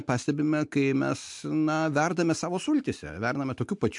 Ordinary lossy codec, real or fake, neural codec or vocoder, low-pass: MP3, 64 kbps; real; none; 10.8 kHz